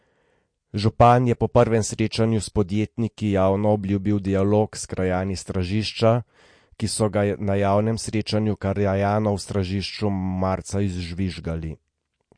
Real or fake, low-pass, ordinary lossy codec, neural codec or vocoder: real; 9.9 kHz; MP3, 48 kbps; none